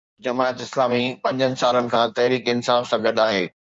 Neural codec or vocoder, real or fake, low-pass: codec, 16 kHz in and 24 kHz out, 1.1 kbps, FireRedTTS-2 codec; fake; 9.9 kHz